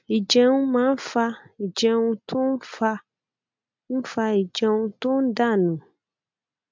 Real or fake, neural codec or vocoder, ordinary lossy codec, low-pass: real; none; MP3, 64 kbps; 7.2 kHz